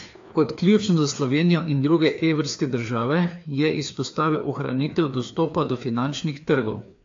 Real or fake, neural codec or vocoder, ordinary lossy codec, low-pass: fake; codec, 16 kHz, 2 kbps, FreqCodec, larger model; AAC, 48 kbps; 7.2 kHz